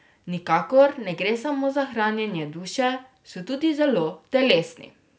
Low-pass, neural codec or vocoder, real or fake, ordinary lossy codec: none; none; real; none